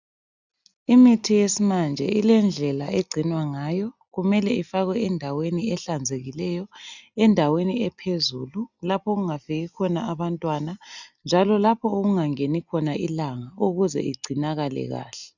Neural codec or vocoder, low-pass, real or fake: none; 7.2 kHz; real